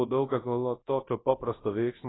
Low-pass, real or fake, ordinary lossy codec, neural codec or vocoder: 7.2 kHz; fake; AAC, 16 kbps; codec, 24 kHz, 0.9 kbps, WavTokenizer, large speech release